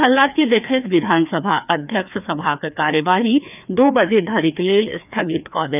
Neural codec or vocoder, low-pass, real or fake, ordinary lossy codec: codec, 16 kHz, 2 kbps, FreqCodec, larger model; 3.6 kHz; fake; none